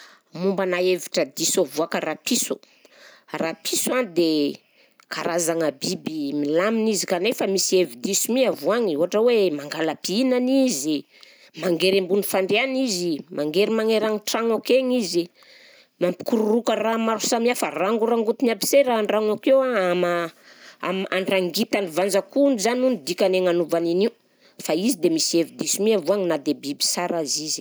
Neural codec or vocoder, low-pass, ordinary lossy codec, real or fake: none; none; none; real